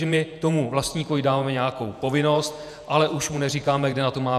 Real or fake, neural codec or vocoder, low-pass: fake; vocoder, 48 kHz, 128 mel bands, Vocos; 14.4 kHz